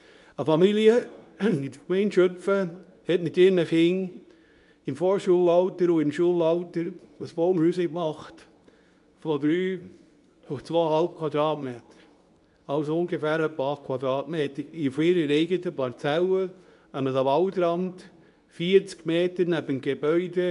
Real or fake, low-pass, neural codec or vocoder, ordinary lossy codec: fake; 10.8 kHz; codec, 24 kHz, 0.9 kbps, WavTokenizer, medium speech release version 2; none